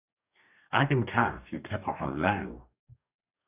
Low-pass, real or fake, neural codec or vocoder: 3.6 kHz; fake; codec, 44.1 kHz, 2.6 kbps, DAC